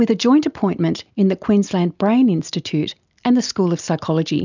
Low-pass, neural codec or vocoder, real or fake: 7.2 kHz; none; real